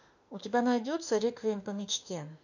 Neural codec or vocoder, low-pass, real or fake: autoencoder, 48 kHz, 32 numbers a frame, DAC-VAE, trained on Japanese speech; 7.2 kHz; fake